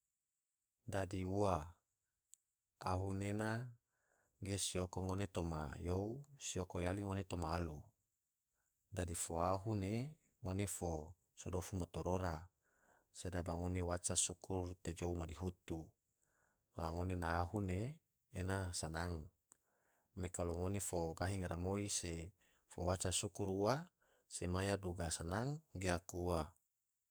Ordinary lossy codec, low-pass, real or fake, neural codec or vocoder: none; none; fake; codec, 44.1 kHz, 2.6 kbps, SNAC